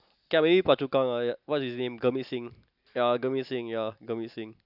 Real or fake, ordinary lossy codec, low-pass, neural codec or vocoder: real; none; 5.4 kHz; none